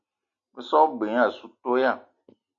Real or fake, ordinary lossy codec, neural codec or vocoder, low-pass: real; AAC, 64 kbps; none; 7.2 kHz